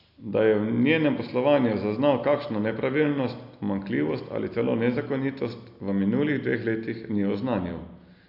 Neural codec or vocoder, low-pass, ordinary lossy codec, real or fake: none; 5.4 kHz; AAC, 48 kbps; real